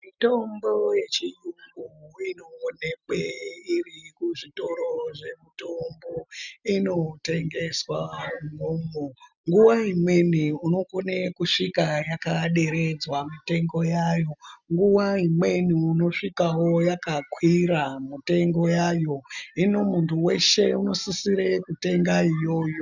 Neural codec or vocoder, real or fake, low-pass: none; real; 7.2 kHz